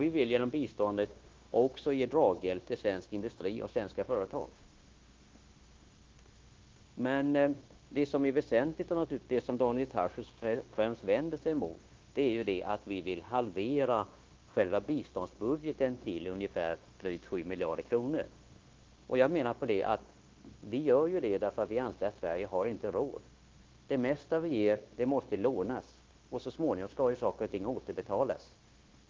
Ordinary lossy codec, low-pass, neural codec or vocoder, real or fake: Opus, 16 kbps; 7.2 kHz; codec, 16 kHz, 0.9 kbps, LongCat-Audio-Codec; fake